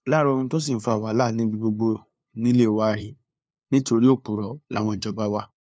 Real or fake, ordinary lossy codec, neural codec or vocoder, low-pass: fake; none; codec, 16 kHz, 2 kbps, FunCodec, trained on LibriTTS, 25 frames a second; none